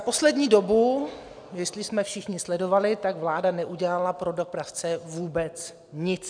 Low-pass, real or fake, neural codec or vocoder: 9.9 kHz; real; none